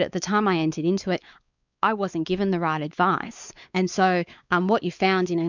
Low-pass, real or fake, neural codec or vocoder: 7.2 kHz; fake; codec, 16 kHz, 4 kbps, X-Codec, WavLM features, trained on Multilingual LibriSpeech